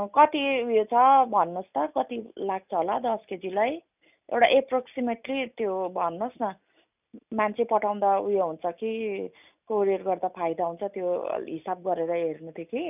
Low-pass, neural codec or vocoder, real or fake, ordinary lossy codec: 3.6 kHz; none; real; none